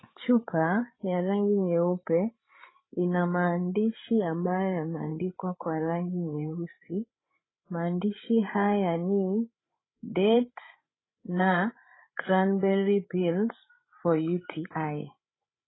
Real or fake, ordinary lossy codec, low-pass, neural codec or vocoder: fake; AAC, 16 kbps; 7.2 kHz; codec, 16 kHz, 16 kbps, FreqCodec, larger model